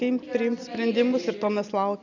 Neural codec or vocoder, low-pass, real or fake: none; 7.2 kHz; real